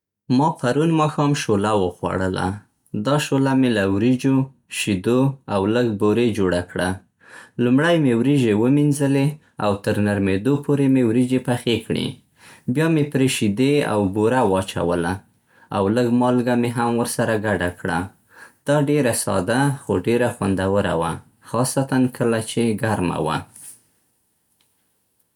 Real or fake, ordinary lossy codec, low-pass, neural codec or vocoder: real; none; 19.8 kHz; none